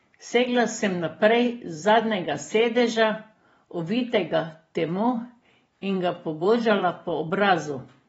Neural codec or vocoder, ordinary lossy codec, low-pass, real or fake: vocoder, 44.1 kHz, 128 mel bands every 512 samples, BigVGAN v2; AAC, 24 kbps; 19.8 kHz; fake